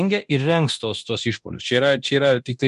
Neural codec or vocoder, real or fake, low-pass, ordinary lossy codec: codec, 24 kHz, 0.9 kbps, DualCodec; fake; 10.8 kHz; MP3, 64 kbps